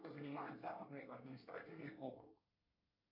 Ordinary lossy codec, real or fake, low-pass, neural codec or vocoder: MP3, 48 kbps; fake; 5.4 kHz; codec, 24 kHz, 1 kbps, SNAC